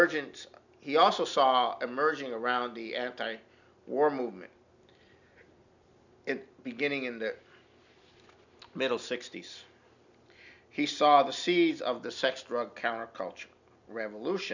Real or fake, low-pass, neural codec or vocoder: real; 7.2 kHz; none